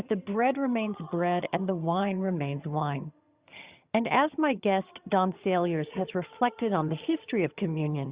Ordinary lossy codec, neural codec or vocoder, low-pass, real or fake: Opus, 64 kbps; vocoder, 22.05 kHz, 80 mel bands, HiFi-GAN; 3.6 kHz; fake